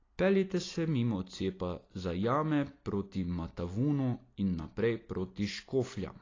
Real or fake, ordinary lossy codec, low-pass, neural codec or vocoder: real; AAC, 32 kbps; 7.2 kHz; none